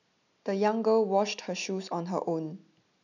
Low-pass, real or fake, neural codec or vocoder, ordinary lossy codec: 7.2 kHz; real; none; none